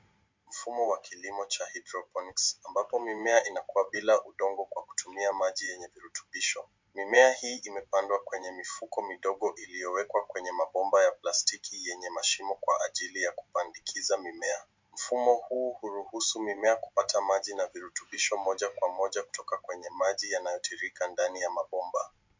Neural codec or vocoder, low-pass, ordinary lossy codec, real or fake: none; 7.2 kHz; MP3, 64 kbps; real